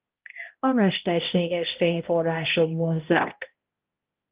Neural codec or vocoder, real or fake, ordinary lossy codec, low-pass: codec, 16 kHz, 0.5 kbps, X-Codec, HuBERT features, trained on balanced general audio; fake; Opus, 32 kbps; 3.6 kHz